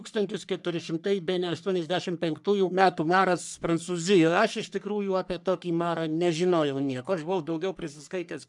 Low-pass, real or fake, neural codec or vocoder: 10.8 kHz; fake; codec, 44.1 kHz, 3.4 kbps, Pupu-Codec